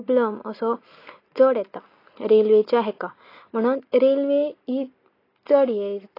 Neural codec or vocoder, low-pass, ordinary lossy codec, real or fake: none; 5.4 kHz; AAC, 24 kbps; real